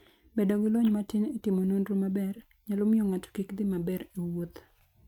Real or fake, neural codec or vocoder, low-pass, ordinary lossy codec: real; none; 19.8 kHz; none